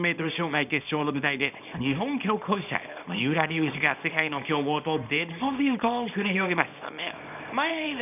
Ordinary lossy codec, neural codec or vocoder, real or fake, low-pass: none; codec, 24 kHz, 0.9 kbps, WavTokenizer, small release; fake; 3.6 kHz